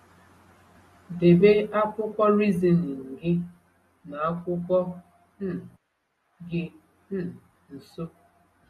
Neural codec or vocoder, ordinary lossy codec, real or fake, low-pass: none; AAC, 32 kbps; real; 19.8 kHz